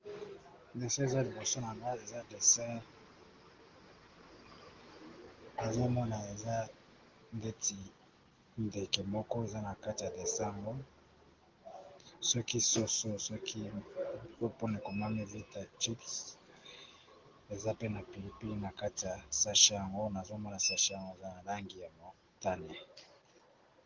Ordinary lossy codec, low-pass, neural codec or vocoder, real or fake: Opus, 16 kbps; 7.2 kHz; none; real